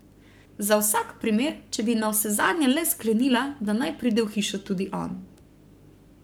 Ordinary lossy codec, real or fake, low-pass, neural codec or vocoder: none; fake; none; codec, 44.1 kHz, 7.8 kbps, Pupu-Codec